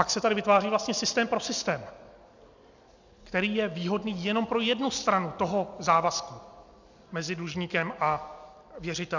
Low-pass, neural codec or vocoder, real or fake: 7.2 kHz; none; real